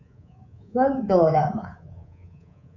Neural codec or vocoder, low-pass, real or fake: codec, 24 kHz, 3.1 kbps, DualCodec; 7.2 kHz; fake